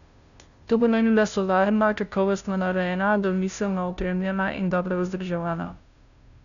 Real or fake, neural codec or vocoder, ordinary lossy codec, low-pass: fake; codec, 16 kHz, 0.5 kbps, FunCodec, trained on Chinese and English, 25 frames a second; none; 7.2 kHz